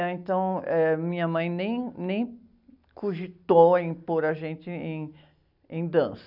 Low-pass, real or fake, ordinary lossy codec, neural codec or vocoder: 5.4 kHz; real; none; none